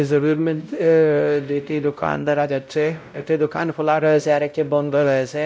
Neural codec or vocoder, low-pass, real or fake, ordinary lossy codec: codec, 16 kHz, 0.5 kbps, X-Codec, WavLM features, trained on Multilingual LibriSpeech; none; fake; none